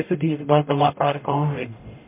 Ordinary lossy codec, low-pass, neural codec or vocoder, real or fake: MP3, 32 kbps; 3.6 kHz; codec, 44.1 kHz, 0.9 kbps, DAC; fake